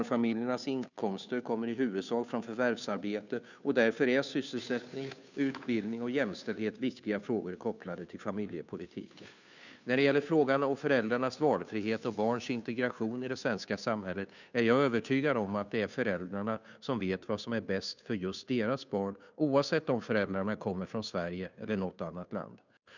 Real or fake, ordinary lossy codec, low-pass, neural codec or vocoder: fake; none; 7.2 kHz; codec, 16 kHz, 2 kbps, FunCodec, trained on Chinese and English, 25 frames a second